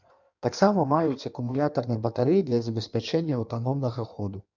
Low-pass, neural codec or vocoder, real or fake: 7.2 kHz; codec, 16 kHz in and 24 kHz out, 1.1 kbps, FireRedTTS-2 codec; fake